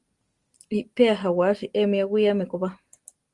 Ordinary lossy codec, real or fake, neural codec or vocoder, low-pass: Opus, 24 kbps; real; none; 10.8 kHz